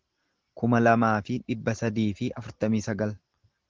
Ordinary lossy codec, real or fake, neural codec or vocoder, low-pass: Opus, 16 kbps; real; none; 7.2 kHz